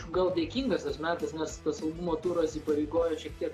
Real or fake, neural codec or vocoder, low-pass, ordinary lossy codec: real; none; 14.4 kHz; AAC, 64 kbps